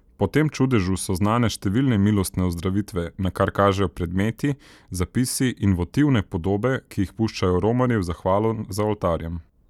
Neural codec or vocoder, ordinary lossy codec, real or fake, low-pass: none; none; real; 19.8 kHz